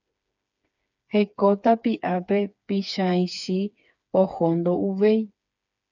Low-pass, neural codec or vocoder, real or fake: 7.2 kHz; codec, 16 kHz, 4 kbps, FreqCodec, smaller model; fake